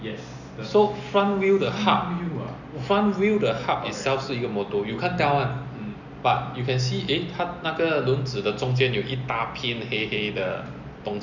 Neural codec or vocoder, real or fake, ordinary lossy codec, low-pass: none; real; none; 7.2 kHz